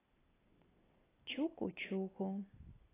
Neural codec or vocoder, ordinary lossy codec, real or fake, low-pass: none; AAC, 16 kbps; real; 3.6 kHz